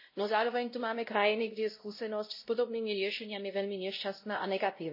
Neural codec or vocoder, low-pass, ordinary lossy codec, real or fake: codec, 16 kHz, 0.5 kbps, X-Codec, WavLM features, trained on Multilingual LibriSpeech; 5.4 kHz; MP3, 24 kbps; fake